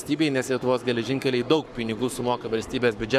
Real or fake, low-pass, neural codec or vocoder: fake; 14.4 kHz; codec, 44.1 kHz, 7.8 kbps, Pupu-Codec